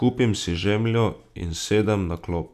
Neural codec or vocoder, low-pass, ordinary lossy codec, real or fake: none; 14.4 kHz; none; real